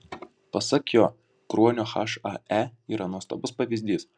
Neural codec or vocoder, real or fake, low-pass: none; real; 9.9 kHz